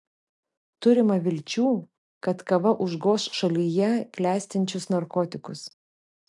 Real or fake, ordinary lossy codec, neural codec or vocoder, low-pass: real; MP3, 96 kbps; none; 10.8 kHz